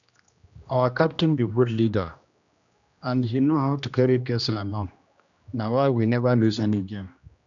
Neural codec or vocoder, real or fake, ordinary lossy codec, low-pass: codec, 16 kHz, 1 kbps, X-Codec, HuBERT features, trained on general audio; fake; none; 7.2 kHz